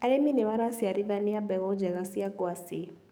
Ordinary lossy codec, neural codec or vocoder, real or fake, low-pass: none; codec, 44.1 kHz, 7.8 kbps, DAC; fake; none